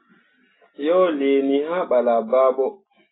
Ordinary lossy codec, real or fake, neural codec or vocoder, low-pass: AAC, 16 kbps; real; none; 7.2 kHz